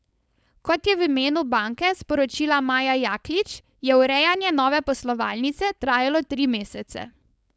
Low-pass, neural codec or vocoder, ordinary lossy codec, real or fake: none; codec, 16 kHz, 4.8 kbps, FACodec; none; fake